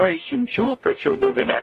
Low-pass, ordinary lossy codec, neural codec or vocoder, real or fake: 14.4 kHz; AAC, 48 kbps; codec, 44.1 kHz, 0.9 kbps, DAC; fake